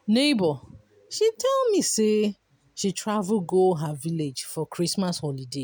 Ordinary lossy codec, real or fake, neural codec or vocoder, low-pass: none; real; none; none